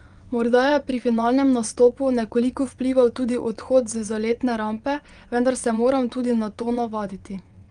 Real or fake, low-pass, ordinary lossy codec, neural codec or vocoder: fake; 9.9 kHz; Opus, 32 kbps; vocoder, 22.05 kHz, 80 mel bands, WaveNeXt